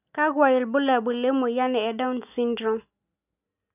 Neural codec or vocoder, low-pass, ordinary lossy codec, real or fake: none; 3.6 kHz; none; real